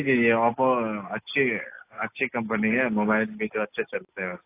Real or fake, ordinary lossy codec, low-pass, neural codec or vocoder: real; AAC, 24 kbps; 3.6 kHz; none